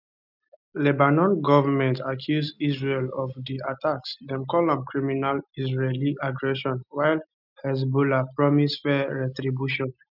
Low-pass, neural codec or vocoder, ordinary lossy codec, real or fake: 5.4 kHz; none; none; real